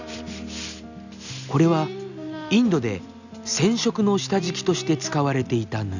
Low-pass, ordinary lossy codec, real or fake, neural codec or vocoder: 7.2 kHz; none; real; none